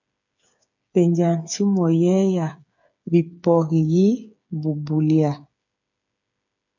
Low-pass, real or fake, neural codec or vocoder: 7.2 kHz; fake; codec, 16 kHz, 8 kbps, FreqCodec, smaller model